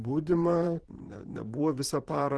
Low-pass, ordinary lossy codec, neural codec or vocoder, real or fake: 10.8 kHz; Opus, 16 kbps; vocoder, 44.1 kHz, 128 mel bands, Pupu-Vocoder; fake